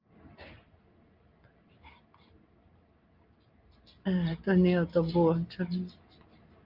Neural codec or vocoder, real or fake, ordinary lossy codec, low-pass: none; real; Opus, 32 kbps; 5.4 kHz